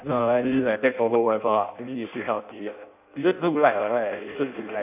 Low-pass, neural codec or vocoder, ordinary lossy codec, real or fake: 3.6 kHz; codec, 16 kHz in and 24 kHz out, 0.6 kbps, FireRedTTS-2 codec; none; fake